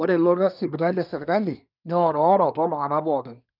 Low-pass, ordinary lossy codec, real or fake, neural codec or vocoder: 5.4 kHz; none; fake; codec, 24 kHz, 1 kbps, SNAC